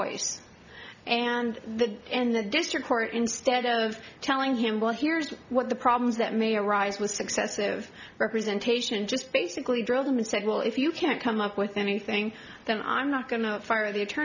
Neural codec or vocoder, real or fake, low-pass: none; real; 7.2 kHz